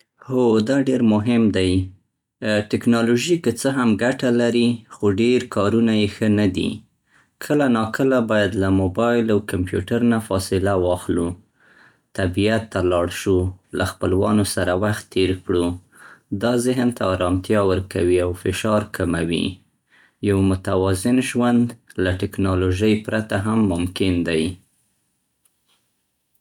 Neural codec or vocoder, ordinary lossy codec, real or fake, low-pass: none; none; real; 19.8 kHz